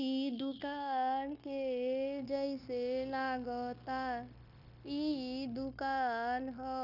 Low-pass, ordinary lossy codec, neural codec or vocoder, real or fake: 5.4 kHz; none; codec, 16 kHz, 0.9 kbps, LongCat-Audio-Codec; fake